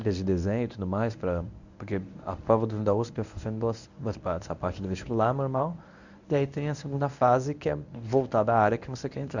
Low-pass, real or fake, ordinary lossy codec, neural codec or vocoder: 7.2 kHz; fake; none; codec, 24 kHz, 0.9 kbps, WavTokenizer, medium speech release version 1